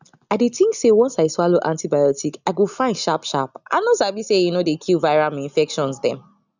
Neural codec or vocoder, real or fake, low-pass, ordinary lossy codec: none; real; 7.2 kHz; none